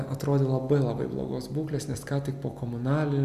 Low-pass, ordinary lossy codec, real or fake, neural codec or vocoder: 14.4 kHz; Opus, 64 kbps; real; none